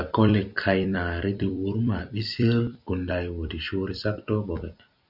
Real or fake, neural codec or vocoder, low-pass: fake; vocoder, 44.1 kHz, 128 mel bands every 256 samples, BigVGAN v2; 5.4 kHz